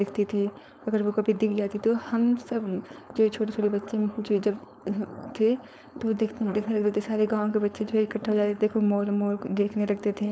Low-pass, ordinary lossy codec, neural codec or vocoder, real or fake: none; none; codec, 16 kHz, 4.8 kbps, FACodec; fake